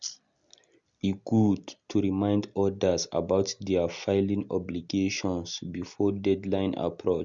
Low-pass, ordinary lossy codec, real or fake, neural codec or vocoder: 7.2 kHz; Opus, 64 kbps; real; none